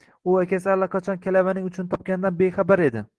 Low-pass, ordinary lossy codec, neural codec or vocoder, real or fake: 10.8 kHz; Opus, 16 kbps; none; real